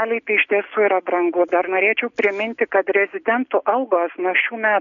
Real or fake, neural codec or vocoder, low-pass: real; none; 7.2 kHz